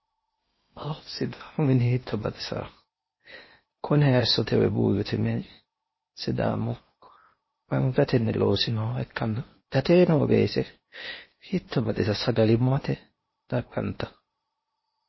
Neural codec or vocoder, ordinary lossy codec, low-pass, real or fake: codec, 16 kHz in and 24 kHz out, 0.8 kbps, FocalCodec, streaming, 65536 codes; MP3, 24 kbps; 7.2 kHz; fake